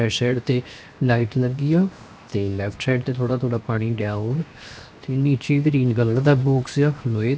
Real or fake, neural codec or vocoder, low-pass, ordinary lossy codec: fake; codec, 16 kHz, 0.7 kbps, FocalCodec; none; none